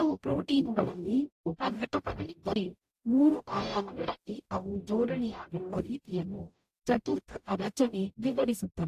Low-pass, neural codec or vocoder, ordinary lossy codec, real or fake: 14.4 kHz; codec, 44.1 kHz, 0.9 kbps, DAC; AAC, 64 kbps; fake